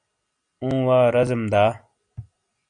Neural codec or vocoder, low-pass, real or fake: none; 9.9 kHz; real